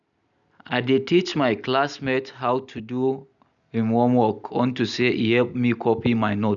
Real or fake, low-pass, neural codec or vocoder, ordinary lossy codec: real; 7.2 kHz; none; none